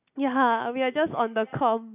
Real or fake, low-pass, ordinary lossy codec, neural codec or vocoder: real; 3.6 kHz; none; none